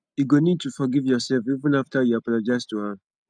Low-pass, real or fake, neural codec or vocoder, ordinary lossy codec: 9.9 kHz; real; none; none